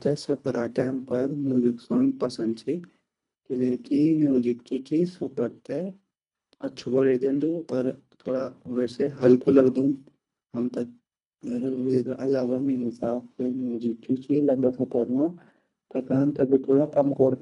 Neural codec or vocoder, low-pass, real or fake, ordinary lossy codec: codec, 24 kHz, 1.5 kbps, HILCodec; 10.8 kHz; fake; none